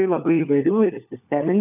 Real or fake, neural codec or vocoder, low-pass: fake; codec, 16 kHz, 4 kbps, FunCodec, trained on LibriTTS, 50 frames a second; 3.6 kHz